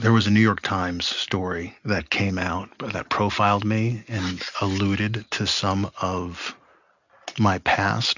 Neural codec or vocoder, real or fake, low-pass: none; real; 7.2 kHz